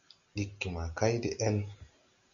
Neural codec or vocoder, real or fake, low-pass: none; real; 7.2 kHz